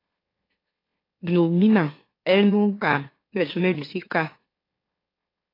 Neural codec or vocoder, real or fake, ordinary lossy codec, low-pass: autoencoder, 44.1 kHz, a latent of 192 numbers a frame, MeloTTS; fake; AAC, 24 kbps; 5.4 kHz